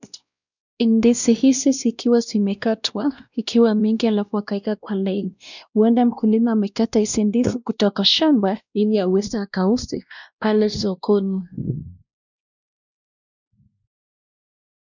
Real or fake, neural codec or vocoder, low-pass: fake; codec, 16 kHz, 1 kbps, X-Codec, WavLM features, trained on Multilingual LibriSpeech; 7.2 kHz